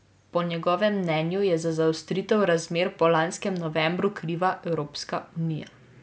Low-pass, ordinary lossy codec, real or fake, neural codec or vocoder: none; none; real; none